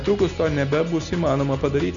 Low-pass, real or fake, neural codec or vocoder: 7.2 kHz; real; none